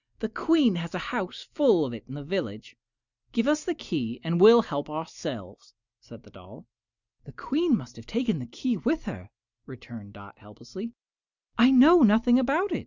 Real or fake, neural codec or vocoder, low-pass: real; none; 7.2 kHz